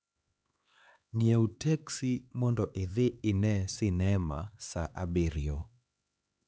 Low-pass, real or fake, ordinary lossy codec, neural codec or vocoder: none; fake; none; codec, 16 kHz, 4 kbps, X-Codec, HuBERT features, trained on LibriSpeech